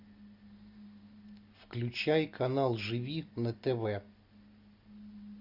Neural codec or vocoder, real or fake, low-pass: none; real; 5.4 kHz